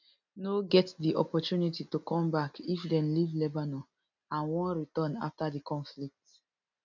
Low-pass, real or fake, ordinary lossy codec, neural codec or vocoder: 7.2 kHz; real; none; none